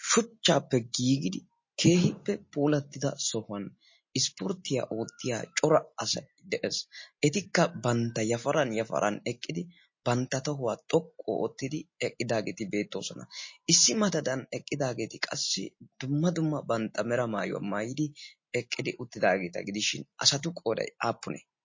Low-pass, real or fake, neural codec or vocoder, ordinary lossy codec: 7.2 kHz; real; none; MP3, 32 kbps